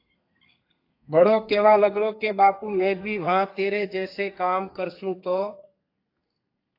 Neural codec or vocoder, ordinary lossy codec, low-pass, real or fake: codec, 32 kHz, 1.9 kbps, SNAC; AAC, 32 kbps; 5.4 kHz; fake